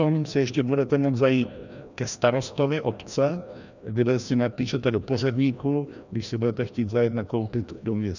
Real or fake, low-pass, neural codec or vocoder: fake; 7.2 kHz; codec, 16 kHz, 1 kbps, FreqCodec, larger model